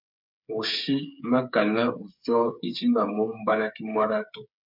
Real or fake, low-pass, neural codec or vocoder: fake; 5.4 kHz; codec, 16 kHz, 8 kbps, FreqCodec, smaller model